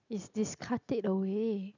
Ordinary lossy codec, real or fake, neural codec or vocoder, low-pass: none; real; none; 7.2 kHz